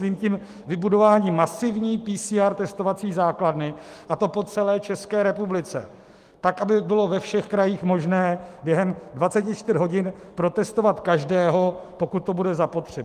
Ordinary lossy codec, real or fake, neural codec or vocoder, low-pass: Opus, 24 kbps; fake; autoencoder, 48 kHz, 128 numbers a frame, DAC-VAE, trained on Japanese speech; 14.4 kHz